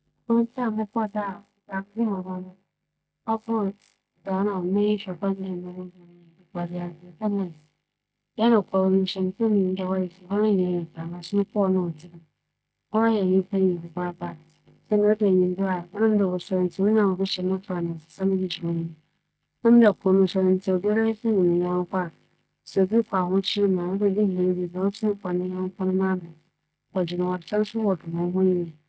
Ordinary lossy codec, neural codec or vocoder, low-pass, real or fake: none; none; none; real